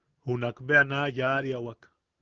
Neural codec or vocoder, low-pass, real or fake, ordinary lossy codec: none; 7.2 kHz; real; Opus, 16 kbps